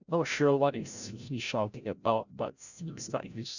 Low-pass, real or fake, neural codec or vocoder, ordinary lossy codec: 7.2 kHz; fake; codec, 16 kHz, 0.5 kbps, FreqCodec, larger model; none